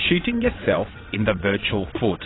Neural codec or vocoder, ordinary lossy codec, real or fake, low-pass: vocoder, 22.05 kHz, 80 mel bands, WaveNeXt; AAC, 16 kbps; fake; 7.2 kHz